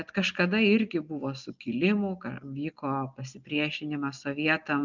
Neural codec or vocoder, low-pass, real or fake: none; 7.2 kHz; real